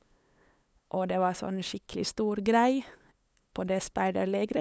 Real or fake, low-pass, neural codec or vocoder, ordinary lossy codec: fake; none; codec, 16 kHz, 8 kbps, FunCodec, trained on LibriTTS, 25 frames a second; none